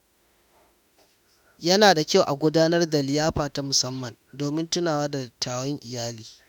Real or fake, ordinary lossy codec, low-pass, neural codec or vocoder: fake; none; 19.8 kHz; autoencoder, 48 kHz, 32 numbers a frame, DAC-VAE, trained on Japanese speech